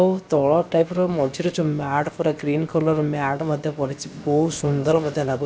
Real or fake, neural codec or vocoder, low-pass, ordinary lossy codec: fake; codec, 16 kHz, about 1 kbps, DyCAST, with the encoder's durations; none; none